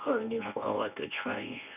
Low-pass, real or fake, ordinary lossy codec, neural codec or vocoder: 3.6 kHz; fake; none; codec, 24 kHz, 0.9 kbps, WavTokenizer, medium speech release version 1